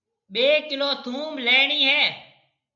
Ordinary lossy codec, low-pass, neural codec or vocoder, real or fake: MP3, 96 kbps; 7.2 kHz; none; real